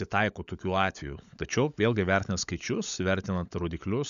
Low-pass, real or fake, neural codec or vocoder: 7.2 kHz; fake; codec, 16 kHz, 16 kbps, FunCodec, trained on LibriTTS, 50 frames a second